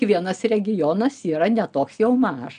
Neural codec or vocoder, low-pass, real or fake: none; 9.9 kHz; real